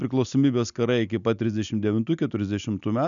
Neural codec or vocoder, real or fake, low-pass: none; real; 7.2 kHz